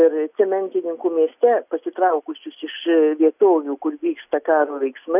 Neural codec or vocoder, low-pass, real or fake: none; 3.6 kHz; real